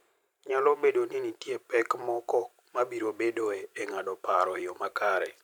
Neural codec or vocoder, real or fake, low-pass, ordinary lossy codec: vocoder, 44.1 kHz, 128 mel bands every 512 samples, BigVGAN v2; fake; none; none